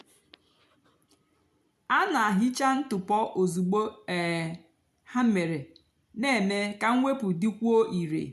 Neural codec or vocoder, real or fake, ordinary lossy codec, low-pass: none; real; MP3, 96 kbps; 14.4 kHz